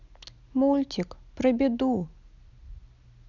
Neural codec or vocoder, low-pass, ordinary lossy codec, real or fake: none; 7.2 kHz; none; real